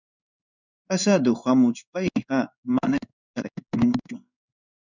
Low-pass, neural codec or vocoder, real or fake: 7.2 kHz; codec, 16 kHz in and 24 kHz out, 1 kbps, XY-Tokenizer; fake